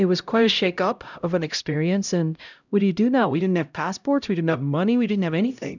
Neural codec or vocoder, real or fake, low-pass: codec, 16 kHz, 0.5 kbps, X-Codec, HuBERT features, trained on LibriSpeech; fake; 7.2 kHz